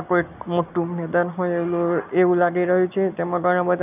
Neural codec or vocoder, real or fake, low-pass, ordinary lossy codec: none; real; 3.6 kHz; none